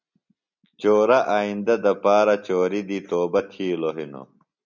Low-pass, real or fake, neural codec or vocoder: 7.2 kHz; real; none